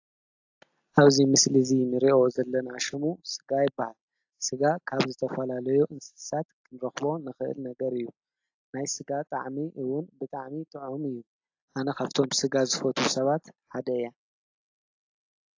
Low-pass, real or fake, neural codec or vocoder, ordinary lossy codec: 7.2 kHz; real; none; AAC, 48 kbps